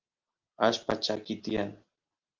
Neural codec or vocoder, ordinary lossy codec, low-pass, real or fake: none; Opus, 24 kbps; 7.2 kHz; real